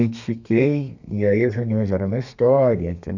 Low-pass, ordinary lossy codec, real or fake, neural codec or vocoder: 7.2 kHz; none; fake; codec, 32 kHz, 1.9 kbps, SNAC